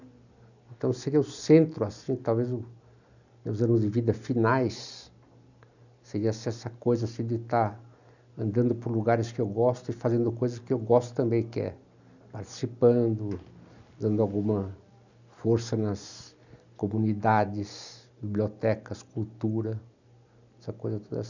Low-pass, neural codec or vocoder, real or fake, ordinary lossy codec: 7.2 kHz; none; real; none